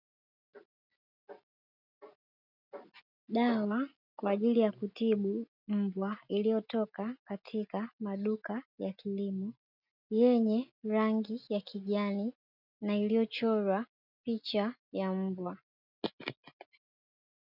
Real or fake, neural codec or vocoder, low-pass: real; none; 5.4 kHz